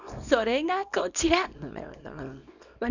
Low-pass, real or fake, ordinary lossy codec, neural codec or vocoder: 7.2 kHz; fake; none; codec, 24 kHz, 0.9 kbps, WavTokenizer, small release